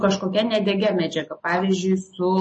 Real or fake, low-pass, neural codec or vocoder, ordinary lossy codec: real; 10.8 kHz; none; MP3, 32 kbps